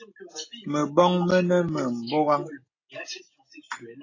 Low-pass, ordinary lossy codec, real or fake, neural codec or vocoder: 7.2 kHz; AAC, 32 kbps; real; none